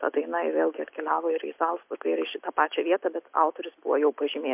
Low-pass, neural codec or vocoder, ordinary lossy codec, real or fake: 3.6 kHz; none; MP3, 32 kbps; real